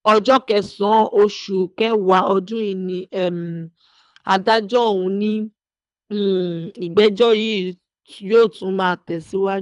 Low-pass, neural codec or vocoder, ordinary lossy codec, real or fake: 10.8 kHz; codec, 24 kHz, 3 kbps, HILCodec; none; fake